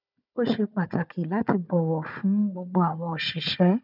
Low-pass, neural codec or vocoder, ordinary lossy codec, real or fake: 5.4 kHz; codec, 16 kHz, 4 kbps, FunCodec, trained on Chinese and English, 50 frames a second; none; fake